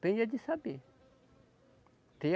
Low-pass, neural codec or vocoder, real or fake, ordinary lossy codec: none; none; real; none